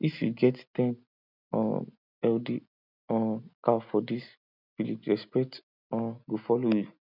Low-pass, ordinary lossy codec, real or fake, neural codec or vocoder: 5.4 kHz; none; real; none